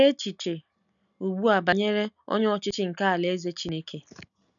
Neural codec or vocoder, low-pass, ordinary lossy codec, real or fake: none; 7.2 kHz; none; real